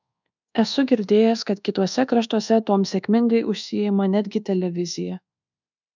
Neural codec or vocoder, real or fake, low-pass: codec, 24 kHz, 1.2 kbps, DualCodec; fake; 7.2 kHz